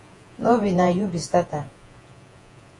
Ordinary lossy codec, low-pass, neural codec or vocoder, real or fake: AAC, 48 kbps; 10.8 kHz; vocoder, 48 kHz, 128 mel bands, Vocos; fake